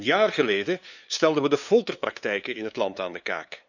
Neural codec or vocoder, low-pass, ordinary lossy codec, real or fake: codec, 16 kHz, 2 kbps, FunCodec, trained on LibriTTS, 25 frames a second; 7.2 kHz; none; fake